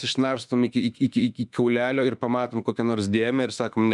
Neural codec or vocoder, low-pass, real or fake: autoencoder, 48 kHz, 32 numbers a frame, DAC-VAE, trained on Japanese speech; 10.8 kHz; fake